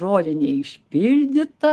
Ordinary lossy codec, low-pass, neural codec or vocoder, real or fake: Opus, 16 kbps; 10.8 kHz; codec, 24 kHz, 3.1 kbps, DualCodec; fake